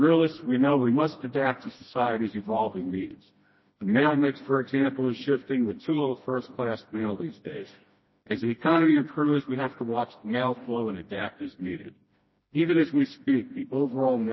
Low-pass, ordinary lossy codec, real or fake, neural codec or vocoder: 7.2 kHz; MP3, 24 kbps; fake; codec, 16 kHz, 1 kbps, FreqCodec, smaller model